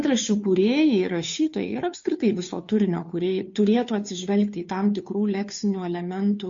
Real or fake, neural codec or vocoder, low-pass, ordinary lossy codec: fake; codec, 16 kHz, 2 kbps, FunCodec, trained on Chinese and English, 25 frames a second; 7.2 kHz; MP3, 48 kbps